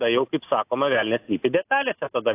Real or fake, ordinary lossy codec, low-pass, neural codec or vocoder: real; AAC, 24 kbps; 3.6 kHz; none